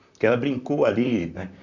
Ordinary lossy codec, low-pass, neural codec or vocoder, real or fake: none; 7.2 kHz; vocoder, 44.1 kHz, 128 mel bands, Pupu-Vocoder; fake